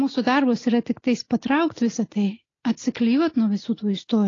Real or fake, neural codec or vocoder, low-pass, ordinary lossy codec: real; none; 7.2 kHz; AAC, 32 kbps